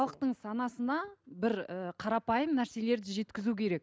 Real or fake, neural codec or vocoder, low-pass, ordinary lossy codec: real; none; none; none